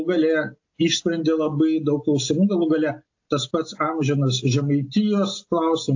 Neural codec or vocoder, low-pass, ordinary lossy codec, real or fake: none; 7.2 kHz; AAC, 48 kbps; real